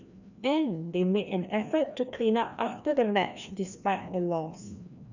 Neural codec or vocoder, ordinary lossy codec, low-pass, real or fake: codec, 16 kHz, 1 kbps, FreqCodec, larger model; none; 7.2 kHz; fake